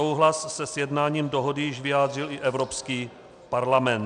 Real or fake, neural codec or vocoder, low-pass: real; none; 9.9 kHz